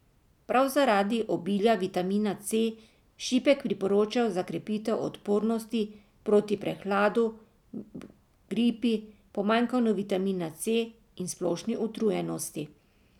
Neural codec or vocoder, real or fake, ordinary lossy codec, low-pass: none; real; none; 19.8 kHz